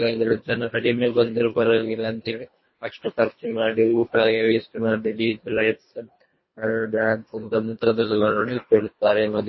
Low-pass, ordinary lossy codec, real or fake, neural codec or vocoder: 7.2 kHz; MP3, 24 kbps; fake; codec, 24 kHz, 1.5 kbps, HILCodec